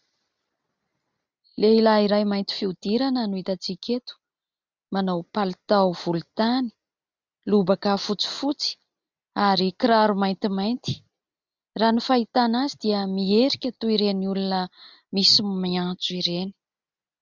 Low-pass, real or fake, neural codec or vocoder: 7.2 kHz; real; none